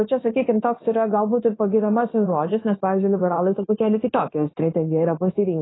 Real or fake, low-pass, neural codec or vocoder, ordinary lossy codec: fake; 7.2 kHz; codec, 16 kHz, 0.9 kbps, LongCat-Audio-Codec; AAC, 16 kbps